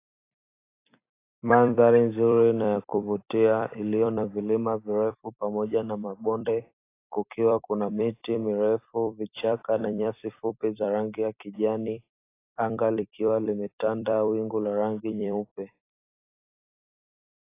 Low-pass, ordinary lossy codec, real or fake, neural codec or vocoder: 3.6 kHz; AAC, 24 kbps; fake; vocoder, 44.1 kHz, 128 mel bands every 256 samples, BigVGAN v2